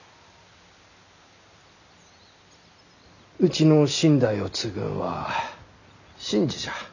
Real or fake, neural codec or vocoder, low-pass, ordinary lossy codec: real; none; 7.2 kHz; none